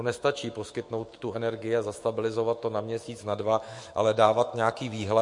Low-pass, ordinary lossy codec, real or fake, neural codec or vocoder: 10.8 kHz; MP3, 48 kbps; fake; autoencoder, 48 kHz, 128 numbers a frame, DAC-VAE, trained on Japanese speech